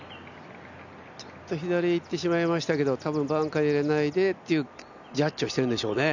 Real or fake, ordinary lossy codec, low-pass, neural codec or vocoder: real; none; 7.2 kHz; none